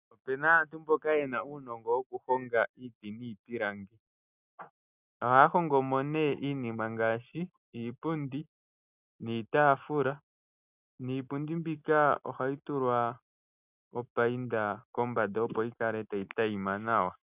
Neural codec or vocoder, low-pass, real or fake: none; 3.6 kHz; real